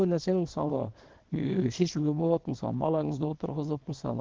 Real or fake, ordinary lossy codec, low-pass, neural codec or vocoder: fake; Opus, 16 kbps; 7.2 kHz; codec, 24 kHz, 0.9 kbps, WavTokenizer, small release